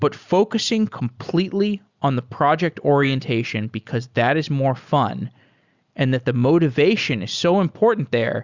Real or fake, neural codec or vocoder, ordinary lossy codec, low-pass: real; none; Opus, 64 kbps; 7.2 kHz